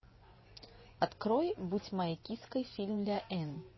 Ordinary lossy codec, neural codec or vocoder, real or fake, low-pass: MP3, 24 kbps; vocoder, 24 kHz, 100 mel bands, Vocos; fake; 7.2 kHz